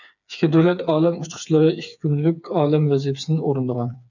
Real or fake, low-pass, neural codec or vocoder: fake; 7.2 kHz; codec, 16 kHz, 4 kbps, FreqCodec, smaller model